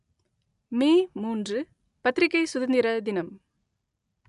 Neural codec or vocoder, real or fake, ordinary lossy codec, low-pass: none; real; none; 10.8 kHz